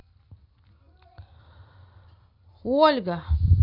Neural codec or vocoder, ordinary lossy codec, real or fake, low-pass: none; none; real; 5.4 kHz